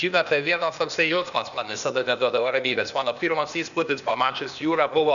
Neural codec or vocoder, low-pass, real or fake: codec, 16 kHz, 0.8 kbps, ZipCodec; 7.2 kHz; fake